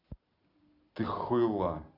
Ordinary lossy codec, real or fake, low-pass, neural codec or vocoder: AAC, 48 kbps; real; 5.4 kHz; none